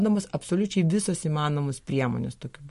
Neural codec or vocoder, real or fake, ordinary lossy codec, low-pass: none; real; MP3, 48 kbps; 14.4 kHz